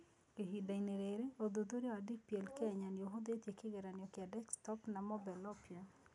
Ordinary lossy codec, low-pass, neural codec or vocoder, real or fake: MP3, 96 kbps; 10.8 kHz; none; real